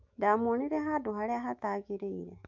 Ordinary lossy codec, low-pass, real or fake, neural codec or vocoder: MP3, 48 kbps; 7.2 kHz; fake; autoencoder, 48 kHz, 128 numbers a frame, DAC-VAE, trained on Japanese speech